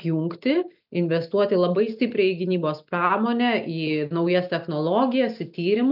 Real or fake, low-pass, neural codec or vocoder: real; 5.4 kHz; none